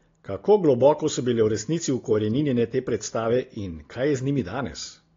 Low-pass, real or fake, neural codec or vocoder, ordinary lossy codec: 7.2 kHz; real; none; AAC, 32 kbps